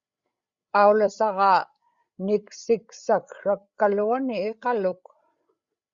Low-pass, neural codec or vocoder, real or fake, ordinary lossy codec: 7.2 kHz; codec, 16 kHz, 8 kbps, FreqCodec, larger model; fake; Opus, 64 kbps